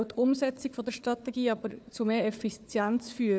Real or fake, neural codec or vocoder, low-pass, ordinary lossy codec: fake; codec, 16 kHz, 4 kbps, FunCodec, trained on Chinese and English, 50 frames a second; none; none